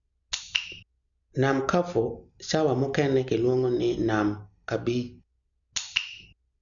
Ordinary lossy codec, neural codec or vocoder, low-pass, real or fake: none; none; 7.2 kHz; real